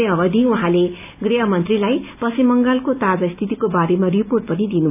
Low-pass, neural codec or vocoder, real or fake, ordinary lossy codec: 3.6 kHz; none; real; none